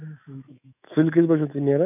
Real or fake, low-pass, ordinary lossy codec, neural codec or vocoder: fake; 3.6 kHz; none; autoencoder, 48 kHz, 128 numbers a frame, DAC-VAE, trained on Japanese speech